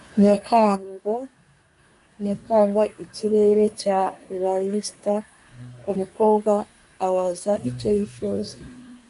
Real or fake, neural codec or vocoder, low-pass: fake; codec, 24 kHz, 1 kbps, SNAC; 10.8 kHz